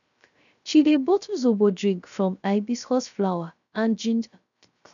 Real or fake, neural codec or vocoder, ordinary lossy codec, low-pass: fake; codec, 16 kHz, 0.3 kbps, FocalCodec; none; 7.2 kHz